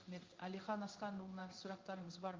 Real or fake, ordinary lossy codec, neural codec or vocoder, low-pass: fake; Opus, 32 kbps; codec, 16 kHz in and 24 kHz out, 1 kbps, XY-Tokenizer; 7.2 kHz